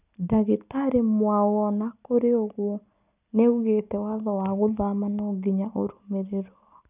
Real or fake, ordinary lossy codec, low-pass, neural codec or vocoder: real; none; 3.6 kHz; none